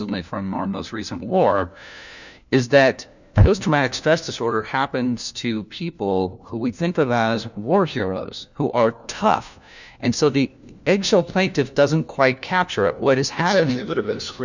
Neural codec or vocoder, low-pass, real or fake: codec, 16 kHz, 1 kbps, FunCodec, trained on LibriTTS, 50 frames a second; 7.2 kHz; fake